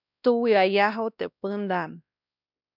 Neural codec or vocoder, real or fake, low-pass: codec, 16 kHz, 1 kbps, X-Codec, WavLM features, trained on Multilingual LibriSpeech; fake; 5.4 kHz